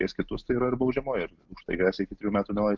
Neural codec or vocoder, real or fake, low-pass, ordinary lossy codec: none; real; 7.2 kHz; Opus, 24 kbps